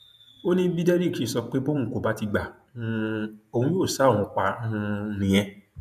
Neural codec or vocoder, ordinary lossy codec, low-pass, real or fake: vocoder, 48 kHz, 128 mel bands, Vocos; none; 14.4 kHz; fake